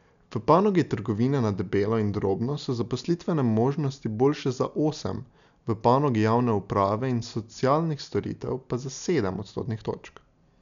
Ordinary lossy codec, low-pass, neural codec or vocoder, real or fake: none; 7.2 kHz; none; real